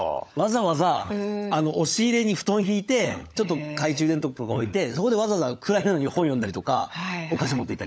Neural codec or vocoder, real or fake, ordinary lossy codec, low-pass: codec, 16 kHz, 16 kbps, FunCodec, trained on LibriTTS, 50 frames a second; fake; none; none